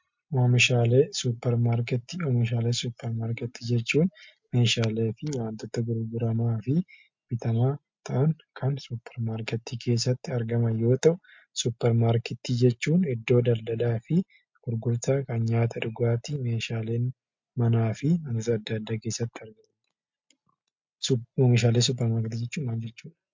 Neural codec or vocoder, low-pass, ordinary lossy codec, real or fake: none; 7.2 kHz; MP3, 48 kbps; real